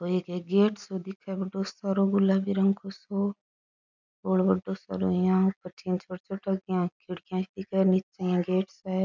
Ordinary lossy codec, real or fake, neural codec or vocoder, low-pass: none; real; none; 7.2 kHz